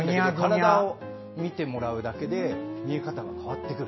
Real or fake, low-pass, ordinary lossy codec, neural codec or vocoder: real; 7.2 kHz; MP3, 24 kbps; none